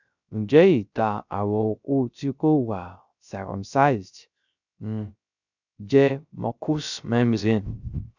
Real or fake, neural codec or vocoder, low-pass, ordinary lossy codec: fake; codec, 16 kHz, 0.3 kbps, FocalCodec; 7.2 kHz; none